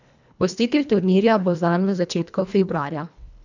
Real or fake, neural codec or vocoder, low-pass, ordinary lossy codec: fake; codec, 24 kHz, 1.5 kbps, HILCodec; 7.2 kHz; none